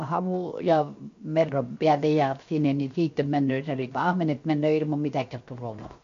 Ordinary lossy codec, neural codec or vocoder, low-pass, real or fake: MP3, 48 kbps; codec, 16 kHz, 0.7 kbps, FocalCodec; 7.2 kHz; fake